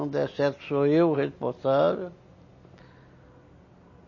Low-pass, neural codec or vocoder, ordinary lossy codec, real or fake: 7.2 kHz; none; MP3, 32 kbps; real